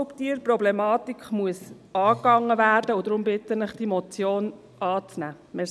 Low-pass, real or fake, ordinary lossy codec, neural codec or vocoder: none; real; none; none